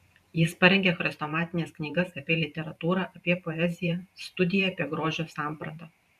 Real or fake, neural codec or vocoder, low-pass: fake; vocoder, 44.1 kHz, 128 mel bands every 512 samples, BigVGAN v2; 14.4 kHz